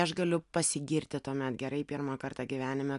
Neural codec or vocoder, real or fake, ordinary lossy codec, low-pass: none; real; MP3, 96 kbps; 10.8 kHz